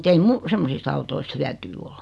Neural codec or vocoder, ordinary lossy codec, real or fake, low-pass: none; none; real; none